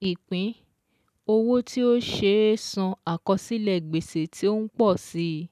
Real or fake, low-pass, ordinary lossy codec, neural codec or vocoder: real; 14.4 kHz; none; none